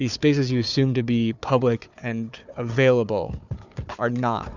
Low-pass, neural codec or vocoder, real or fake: 7.2 kHz; codec, 16 kHz, 4 kbps, FunCodec, trained on Chinese and English, 50 frames a second; fake